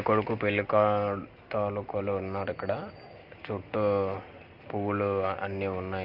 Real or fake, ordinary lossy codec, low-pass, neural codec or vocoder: real; Opus, 32 kbps; 5.4 kHz; none